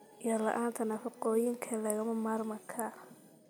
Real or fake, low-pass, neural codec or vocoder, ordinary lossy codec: real; none; none; none